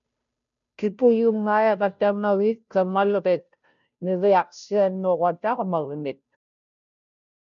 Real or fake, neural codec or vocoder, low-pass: fake; codec, 16 kHz, 0.5 kbps, FunCodec, trained on Chinese and English, 25 frames a second; 7.2 kHz